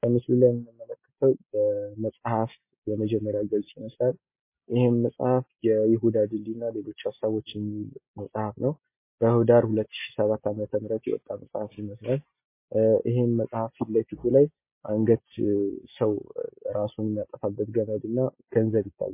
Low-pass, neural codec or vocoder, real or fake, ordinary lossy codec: 3.6 kHz; none; real; MP3, 24 kbps